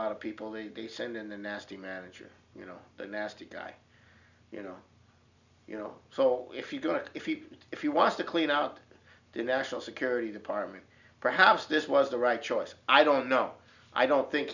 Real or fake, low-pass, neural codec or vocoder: real; 7.2 kHz; none